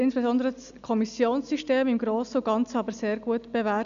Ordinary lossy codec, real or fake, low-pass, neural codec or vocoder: none; real; 7.2 kHz; none